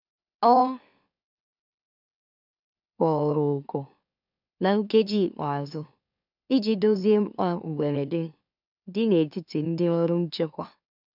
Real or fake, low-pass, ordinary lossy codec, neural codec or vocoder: fake; 5.4 kHz; none; autoencoder, 44.1 kHz, a latent of 192 numbers a frame, MeloTTS